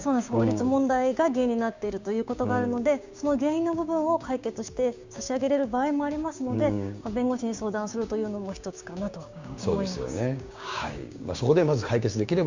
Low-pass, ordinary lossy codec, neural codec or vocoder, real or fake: 7.2 kHz; Opus, 64 kbps; codec, 16 kHz, 6 kbps, DAC; fake